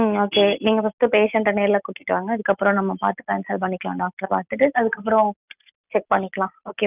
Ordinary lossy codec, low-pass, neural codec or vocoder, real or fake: none; 3.6 kHz; none; real